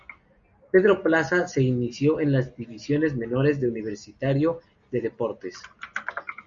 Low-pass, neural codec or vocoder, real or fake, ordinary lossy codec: 7.2 kHz; none; real; Opus, 64 kbps